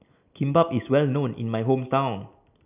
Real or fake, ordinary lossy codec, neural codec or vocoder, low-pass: real; none; none; 3.6 kHz